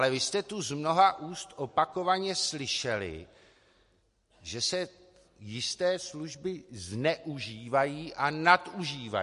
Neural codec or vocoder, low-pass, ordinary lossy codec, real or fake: none; 14.4 kHz; MP3, 48 kbps; real